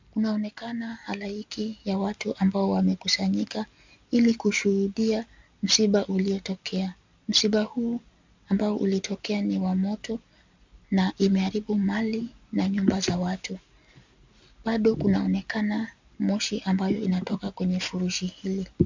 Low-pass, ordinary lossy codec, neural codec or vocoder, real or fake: 7.2 kHz; MP3, 64 kbps; none; real